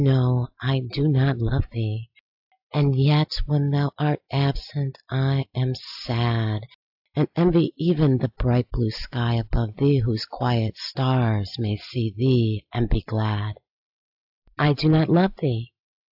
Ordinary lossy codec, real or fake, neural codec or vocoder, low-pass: AAC, 48 kbps; real; none; 5.4 kHz